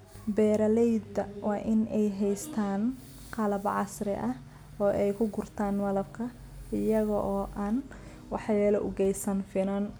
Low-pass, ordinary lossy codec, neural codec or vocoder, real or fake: none; none; none; real